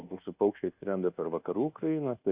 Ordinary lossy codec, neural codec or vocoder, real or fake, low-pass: Opus, 64 kbps; codec, 24 kHz, 1.2 kbps, DualCodec; fake; 3.6 kHz